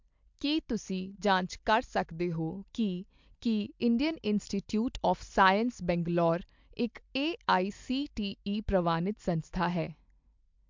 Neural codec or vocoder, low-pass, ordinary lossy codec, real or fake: none; 7.2 kHz; MP3, 64 kbps; real